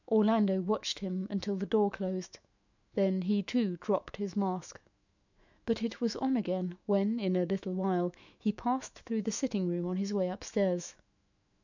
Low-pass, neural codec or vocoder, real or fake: 7.2 kHz; autoencoder, 48 kHz, 128 numbers a frame, DAC-VAE, trained on Japanese speech; fake